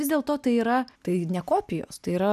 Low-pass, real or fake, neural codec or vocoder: 14.4 kHz; real; none